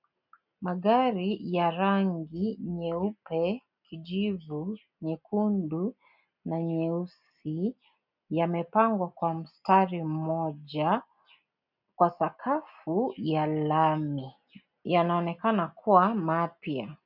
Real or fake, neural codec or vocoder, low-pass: real; none; 5.4 kHz